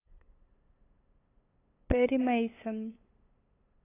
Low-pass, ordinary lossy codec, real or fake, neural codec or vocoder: 3.6 kHz; AAC, 24 kbps; fake; codec, 16 kHz, 8 kbps, FunCodec, trained on LibriTTS, 25 frames a second